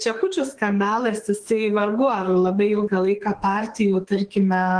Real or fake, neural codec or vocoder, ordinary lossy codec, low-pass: fake; autoencoder, 48 kHz, 32 numbers a frame, DAC-VAE, trained on Japanese speech; Opus, 16 kbps; 9.9 kHz